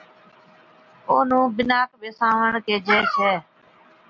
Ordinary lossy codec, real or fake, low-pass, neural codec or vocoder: MP3, 48 kbps; real; 7.2 kHz; none